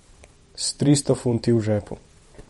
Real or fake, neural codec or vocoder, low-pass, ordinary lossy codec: fake; vocoder, 44.1 kHz, 128 mel bands every 512 samples, BigVGAN v2; 19.8 kHz; MP3, 48 kbps